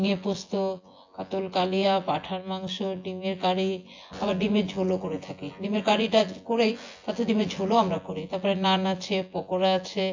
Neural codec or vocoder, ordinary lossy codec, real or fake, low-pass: vocoder, 24 kHz, 100 mel bands, Vocos; AAC, 48 kbps; fake; 7.2 kHz